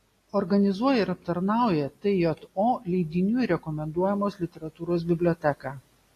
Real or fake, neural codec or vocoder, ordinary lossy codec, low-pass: real; none; AAC, 48 kbps; 14.4 kHz